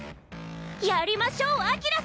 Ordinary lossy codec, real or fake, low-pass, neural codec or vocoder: none; real; none; none